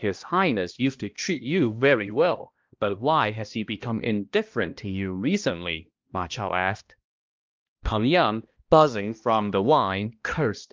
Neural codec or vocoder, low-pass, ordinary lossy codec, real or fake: codec, 16 kHz, 1 kbps, X-Codec, HuBERT features, trained on balanced general audio; 7.2 kHz; Opus, 24 kbps; fake